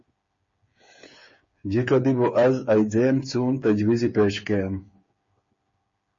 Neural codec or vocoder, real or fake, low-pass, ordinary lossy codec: codec, 16 kHz, 8 kbps, FreqCodec, smaller model; fake; 7.2 kHz; MP3, 32 kbps